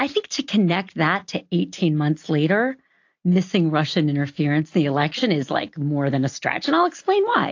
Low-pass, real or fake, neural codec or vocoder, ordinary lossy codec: 7.2 kHz; real; none; AAC, 48 kbps